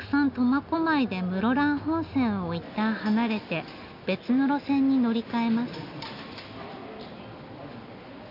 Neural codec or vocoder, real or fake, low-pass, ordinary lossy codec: none; real; 5.4 kHz; none